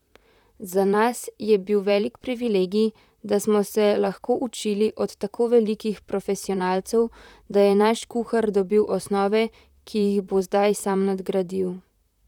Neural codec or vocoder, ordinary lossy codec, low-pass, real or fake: vocoder, 44.1 kHz, 128 mel bands, Pupu-Vocoder; none; 19.8 kHz; fake